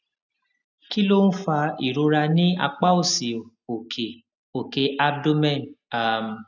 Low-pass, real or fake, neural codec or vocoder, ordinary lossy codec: 7.2 kHz; real; none; none